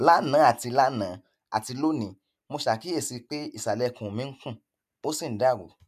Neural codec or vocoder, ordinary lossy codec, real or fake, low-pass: none; none; real; 14.4 kHz